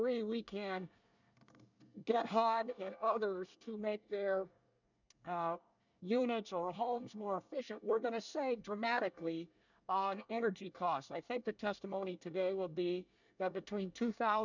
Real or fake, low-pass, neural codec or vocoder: fake; 7.2 kHz; codec, 24 kHz, 1 kbps, SNAC